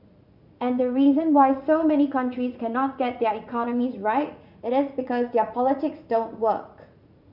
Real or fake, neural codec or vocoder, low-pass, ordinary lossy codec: fake; vocoder, 44.1 kHz, 80 mel bands, Vocos; 5.4 kHz; none